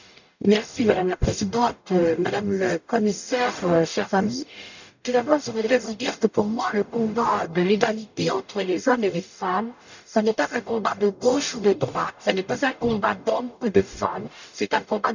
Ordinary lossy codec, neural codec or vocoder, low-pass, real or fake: none; codec, 44.1 kHz, 0.9 kbps, DAC; 7.2 kHz; fake